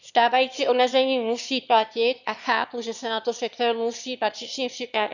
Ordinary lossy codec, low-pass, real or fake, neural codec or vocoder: none; 7.2 kHz; fake; autoencoder, 22.05 kHz, a latent of 192 numbers a frame, VITS, trained on one speaker